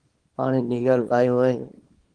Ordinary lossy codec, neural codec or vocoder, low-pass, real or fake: Opus, 24 kbps; codec, 24 kHz, 0.9 kbps, WavTokenizer, small release; 9.9 kHz; fake